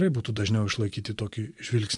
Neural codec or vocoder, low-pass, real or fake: vocoder, 24 kHz, 100 mel bands, Vocos; 10.8 kHz; fake